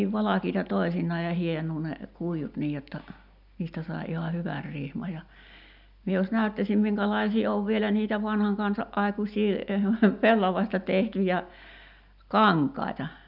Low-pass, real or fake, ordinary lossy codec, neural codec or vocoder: 5.4 kHz; real; none; none